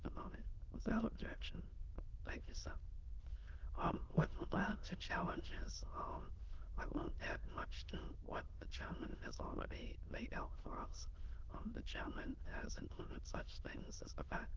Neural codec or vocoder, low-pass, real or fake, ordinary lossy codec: autoencoder, 22.05 kHz, a latent of 192 numbers a frame, VITS, trained on many speakers; 7.2 kHz; fake; Opus, 16 kbps